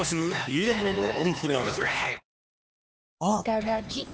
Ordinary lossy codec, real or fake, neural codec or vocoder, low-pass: none; fake; codec, 16 kHz, 2 kbps, X-Codec, HuBERT features, trained on LibriSpeech; none